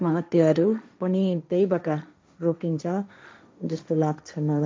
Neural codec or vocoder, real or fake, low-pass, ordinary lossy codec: codec, 16 kHz, 1.1 kbps, Voila-Tokenizer; fake; none; none